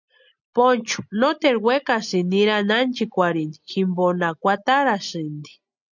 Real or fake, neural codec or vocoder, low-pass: real; none; 7.2 kHz